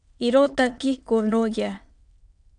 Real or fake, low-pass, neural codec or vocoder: fake; 9.9 kHz; autoencoder, 22.05 kHz, a latent of 192 numbers a frame, VITS, trained on many speakers